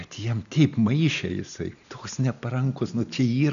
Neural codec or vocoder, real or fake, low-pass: none; real; 7.2 kHz